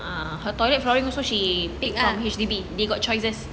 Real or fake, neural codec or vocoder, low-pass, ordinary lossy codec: real; none; none; none